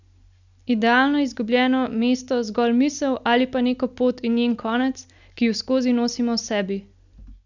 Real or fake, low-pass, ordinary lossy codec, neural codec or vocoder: real; 7.2 kHz; none; none